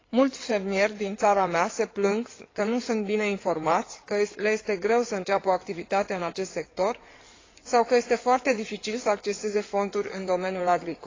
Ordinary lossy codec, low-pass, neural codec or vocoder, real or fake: AAC, 32 kbps; 7.2 kHz; codec, 16 kHz in and 24 kHz out, 2.2 kbps, FireRedTTS-2 codec; fake